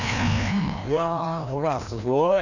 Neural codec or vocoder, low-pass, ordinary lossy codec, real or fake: codec, 16 kHz, 1 kbps, FreqCodec, larger model; 7.2 kHz; none; fake